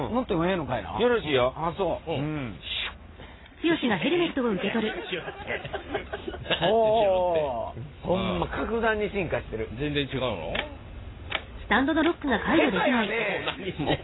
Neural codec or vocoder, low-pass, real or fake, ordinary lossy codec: none; 7.2 kHz; real; AAC, 16 kbps